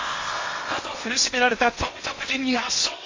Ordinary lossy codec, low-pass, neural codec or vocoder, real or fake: MP3, 32 kbps; 7.2 kHz; codec, 16 kHz in and 24 kHz out, 0.8 kbps, FocalCodec, streaming, 65536 codes; fake